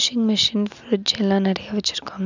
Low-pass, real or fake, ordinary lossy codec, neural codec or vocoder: 7.2 kHz; real; none; none